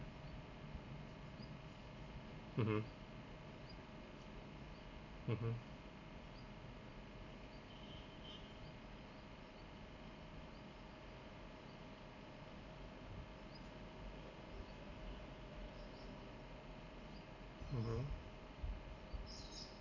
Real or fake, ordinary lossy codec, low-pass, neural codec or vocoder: real; none; 7.2 kHz; none